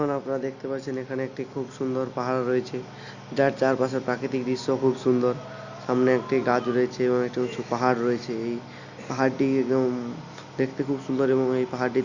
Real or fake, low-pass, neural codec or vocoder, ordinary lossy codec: real; 7.2 kHz; none; none